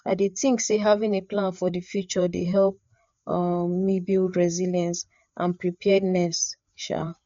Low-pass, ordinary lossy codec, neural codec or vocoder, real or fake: 7.2 kHz; MP3, 48 kbps; codec, 16 kHz, 4 kbps, FreqCodec, larger model; fake